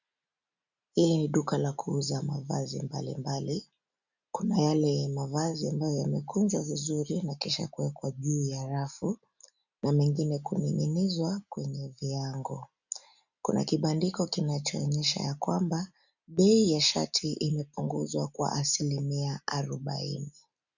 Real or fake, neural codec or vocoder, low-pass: real; none; 7.2 kHz